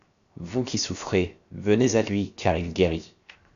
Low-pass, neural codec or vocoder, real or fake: 7.2 kHz; codec, 16 kHz, 0.7 kbps, FocalCodec; fake